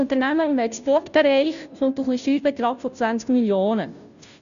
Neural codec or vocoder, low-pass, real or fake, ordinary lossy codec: codec, 16 kHz, 0.5 kbps, FunCodec, trained on Chinese and English, 25 frames a second; 7.2 kHz; fake; none